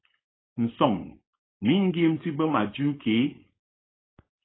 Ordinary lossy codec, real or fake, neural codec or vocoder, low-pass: AAC, 16 kbps; fake; codec, 16 kHz, 4.8 kbps, FACodec; 7.2 kHz